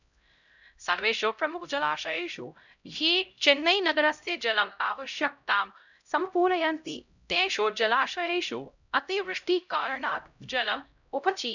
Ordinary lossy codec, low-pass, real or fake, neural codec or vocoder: none; 7.2 kHz; fake; codec, 16 kHz, 0.5 kbps, X-Codec, HuBERT features, trained on LibriSpeech